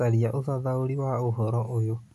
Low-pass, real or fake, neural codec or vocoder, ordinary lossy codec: 14.4 kHz; real; none; AAC, 64 kbps